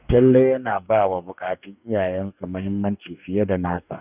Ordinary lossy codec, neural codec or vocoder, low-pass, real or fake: none; codec, 44.1 kHz, 2.6 kbps, DAC; 3.6 kHz; fake